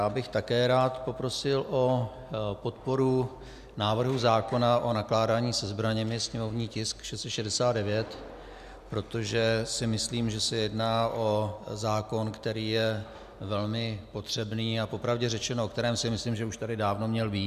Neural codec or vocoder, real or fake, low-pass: none; real; 14.4 kHz